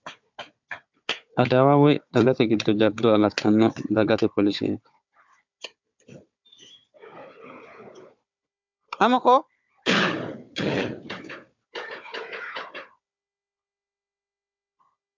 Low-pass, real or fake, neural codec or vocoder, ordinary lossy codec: 7.2 kHz; fake; codec, 16 kHz, 4 kbps, FunCodec, trained on Chinese and English, 50 frames a second; MP3, 64 kbps